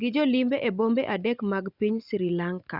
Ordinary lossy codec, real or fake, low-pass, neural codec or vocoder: Opus, 64 kbps; real; 5.4 kHz; none